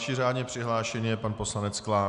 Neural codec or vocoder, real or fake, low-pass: none; real; 10.8 kHz